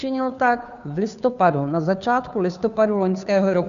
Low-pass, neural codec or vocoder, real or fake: 7.2 kHz; codec, 16 kHz, 2 kbps, FunCodec, trained on Chinese and English, 25 frames a second; fake